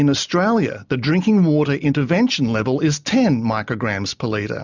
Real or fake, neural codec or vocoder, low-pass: real; none; 7.2 kHz